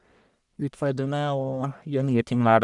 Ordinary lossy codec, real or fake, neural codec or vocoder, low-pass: none; fake; codec, 44.1 kHz, 1.7 kbps, Pupu-Codec; 10.8 kHz